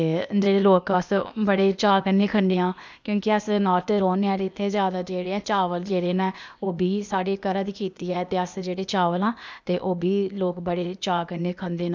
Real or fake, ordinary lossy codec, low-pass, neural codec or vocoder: fake; none; none; codec, 16 kHz, 0.8 kbps, ZipCodec